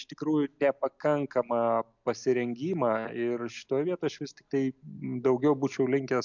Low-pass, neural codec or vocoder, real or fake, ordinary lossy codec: 7.2 kHz; none; real; MP3, 64 kbps